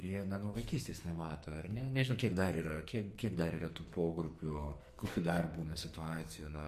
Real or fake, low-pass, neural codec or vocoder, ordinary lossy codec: fake; 14.4 kHz; codec, 44.1 kHz, 2.6 kbps, SNAC; MP3, 64 kbps